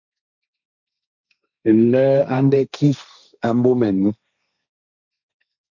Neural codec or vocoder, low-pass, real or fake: codec, 16 kHz, 1.1 kbps, Voila-Tokenizer; 7.2 kHz; fake